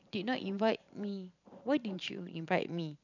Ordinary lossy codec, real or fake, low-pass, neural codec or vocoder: none; fake; 7.2 kHz; vocoder, 44.1 kHz, 128 mel bands every 512 samples, BigVGAN v2